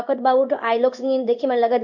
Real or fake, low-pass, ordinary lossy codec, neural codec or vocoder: fake; 7.2 kHz; none; codec, 16 kHz in and 24 kHz out, 1 kbps, XY-Tokenizer